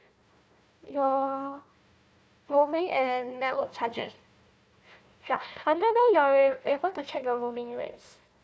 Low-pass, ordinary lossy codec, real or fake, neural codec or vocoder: none; none; fake; codec, 16 kHz, 1 kbps, FunCodec, trained on Chinese and English, 50 frames a second